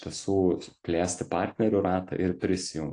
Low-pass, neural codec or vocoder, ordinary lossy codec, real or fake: 9.9 kHz; none; AAC, 48 kbps; real